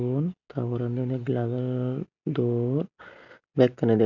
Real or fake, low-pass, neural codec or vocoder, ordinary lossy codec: real; 7.2 kHz; none; none